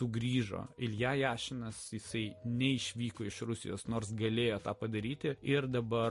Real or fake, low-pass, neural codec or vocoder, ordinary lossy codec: real; 14.4 kHz; none; MP3, 48 kbps